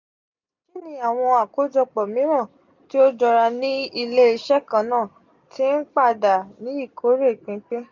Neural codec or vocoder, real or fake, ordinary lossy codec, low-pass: none; real; none; 7.2 kHz